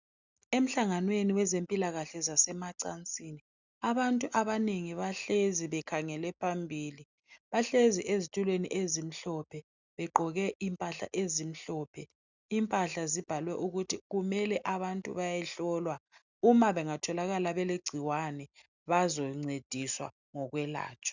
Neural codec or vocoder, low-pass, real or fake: none; 7.2 kHz; real